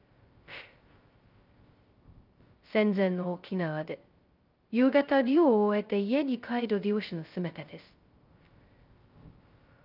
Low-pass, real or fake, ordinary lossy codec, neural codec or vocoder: 5.4 kHz; fake; Opus, 24 kbps; codec, 16 kHz, 0.2 kbps, FocalCodec